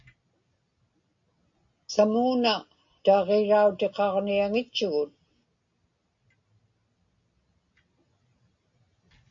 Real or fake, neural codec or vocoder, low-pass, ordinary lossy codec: real; none; 7.2 kHz; MP3, 48 kbps